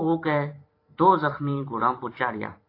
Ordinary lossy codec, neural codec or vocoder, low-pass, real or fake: AAC, 32 kbps; vocoder, 44.1 kHz, 128 mel bands every 512 samples, BigVGAN v2; 5.4 kHz; fake